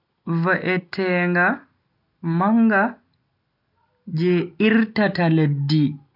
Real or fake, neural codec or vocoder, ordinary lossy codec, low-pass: real; none; none; 5.4 kHz